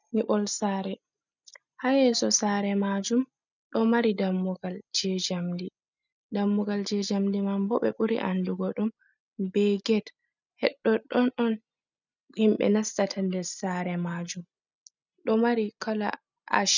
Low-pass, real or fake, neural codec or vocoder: 7.2 kHz; real; none